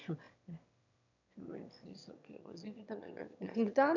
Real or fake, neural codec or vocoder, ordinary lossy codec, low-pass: fake; autoencoder, 22.05 kHz, a latent of 192 numbers a frame, VITS, trained on one speaker; none; 7.2 kHz